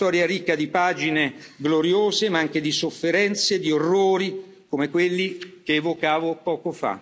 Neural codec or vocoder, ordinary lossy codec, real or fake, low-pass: none; none; real; none